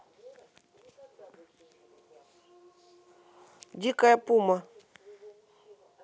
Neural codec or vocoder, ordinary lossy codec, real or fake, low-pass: none; none; real; none